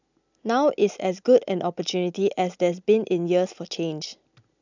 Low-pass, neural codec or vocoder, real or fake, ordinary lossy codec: 7.2 kHz; none; real; none